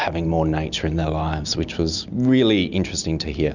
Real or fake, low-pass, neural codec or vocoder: real; 7.2 kHz; none